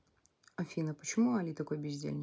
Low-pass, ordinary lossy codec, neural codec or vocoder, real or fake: none; none; none; real